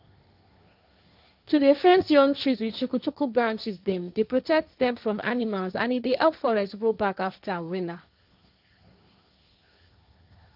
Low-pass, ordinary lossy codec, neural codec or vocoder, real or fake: 5.4 kHz; none; codec, 16 kHz, 1.1 kbps, Voila-Tokenizer; fake